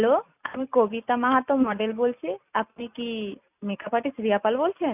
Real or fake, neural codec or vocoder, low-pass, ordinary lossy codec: real; none; 3.6 kHz; none